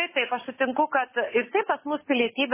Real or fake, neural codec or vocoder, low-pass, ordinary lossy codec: real; none; 3.6 kHz; MP3, 16 kbps